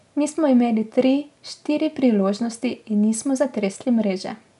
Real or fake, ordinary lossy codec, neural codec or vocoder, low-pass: real; none; none; 10.8 kHz